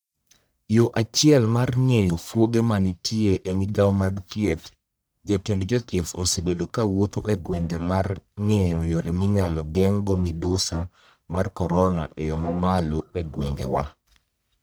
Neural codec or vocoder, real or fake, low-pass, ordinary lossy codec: codec, 44.1 kHz, 1.7 kbps, Pupu-Codec; fake; none; none